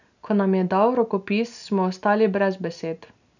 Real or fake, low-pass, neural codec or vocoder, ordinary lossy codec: real; 7.2 kHz; none; none